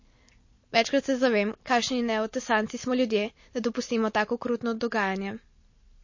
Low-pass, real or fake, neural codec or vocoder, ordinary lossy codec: 7.2 kHz; real; none; MP3, 32 kbps